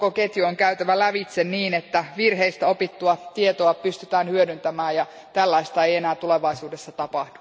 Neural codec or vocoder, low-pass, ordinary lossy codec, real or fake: none; none; none; real